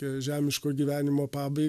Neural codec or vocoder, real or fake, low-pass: none; real; 14.4 kHz